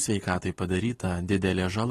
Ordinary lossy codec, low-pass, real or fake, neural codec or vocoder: AAC, 32 kbps; 19.8 kHz; real; none